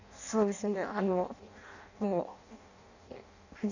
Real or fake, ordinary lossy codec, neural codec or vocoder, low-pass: fake; none; codec, 16 kHz in and 24 kHz out, 0.6 kbps, FireRedTTS-2 codec; 7.2 kHz